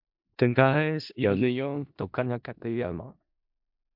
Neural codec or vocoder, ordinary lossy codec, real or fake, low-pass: codec, 16 kHz in and 24 kHz out, 0.4 kbps, LongCat-Audio-Codec, four codebook decoder; MP3, 48 kbps; fake; 5.4 kHz